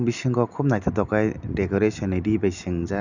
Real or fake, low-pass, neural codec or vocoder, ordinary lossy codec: real; 7.2 kHz; none; none